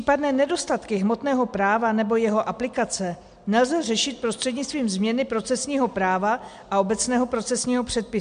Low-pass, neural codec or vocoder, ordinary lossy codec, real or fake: 9.9 kHz; none; MP3, 64 kbps; real